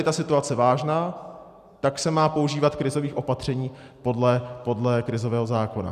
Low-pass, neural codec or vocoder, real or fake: 14.4 kHz; none; real